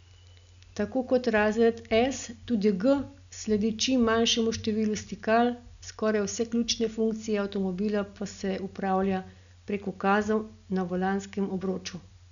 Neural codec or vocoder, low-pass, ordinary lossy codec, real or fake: none; 7.2 kHz; none; real